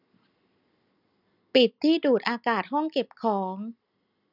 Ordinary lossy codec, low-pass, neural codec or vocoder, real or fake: none; 5.4 kHz; none; real